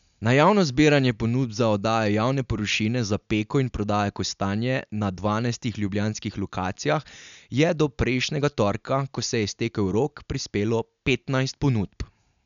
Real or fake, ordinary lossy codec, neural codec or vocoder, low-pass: real; none; none; 7.2 kHz